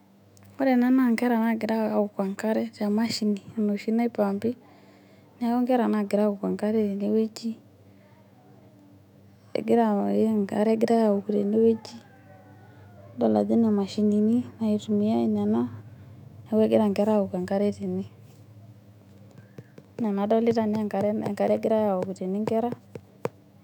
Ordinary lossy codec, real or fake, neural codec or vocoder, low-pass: none; fake; autoencoder, 48 kHz, 128 numbers a frame, DAC-VAE, trained on Japanese speech; 19.8 kHz